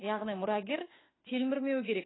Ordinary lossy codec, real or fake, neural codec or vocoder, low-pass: AAC, 16 kbps; fake; autoencoder, 48 kHz, 32 numbers a frame, DAC-VAE, trained on Japanese speech; 7.2 kHz